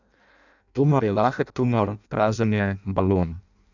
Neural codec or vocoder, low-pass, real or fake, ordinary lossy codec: codec, 16 kHz in and 24 kHz out, 0.6 kbps, FireRedTTS-2 codec; 7.2 kHz; fake; none